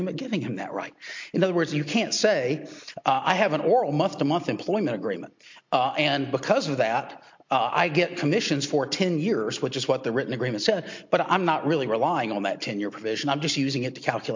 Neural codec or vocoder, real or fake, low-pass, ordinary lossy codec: none; real; 7.2 kHz; MP3, 48 kbps